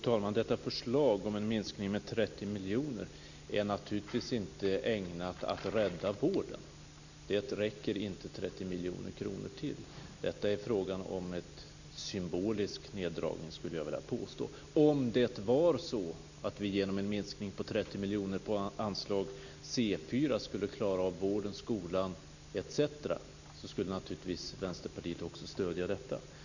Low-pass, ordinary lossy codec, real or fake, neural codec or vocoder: 7.2 kHz; none; real; none